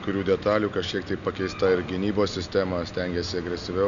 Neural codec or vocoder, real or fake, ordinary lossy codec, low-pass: none; real; MP3, 96 kbps; 7.2 kHz